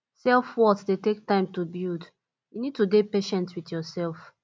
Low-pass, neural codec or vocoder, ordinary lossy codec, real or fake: none; none; none; real